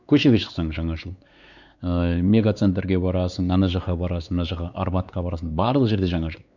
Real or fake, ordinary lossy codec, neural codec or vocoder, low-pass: fake; none; codec, 16 kHz, 4 kbps, X-Codec, WavLM features, trained on Multilingual LibriSpeech; 7.2 kHz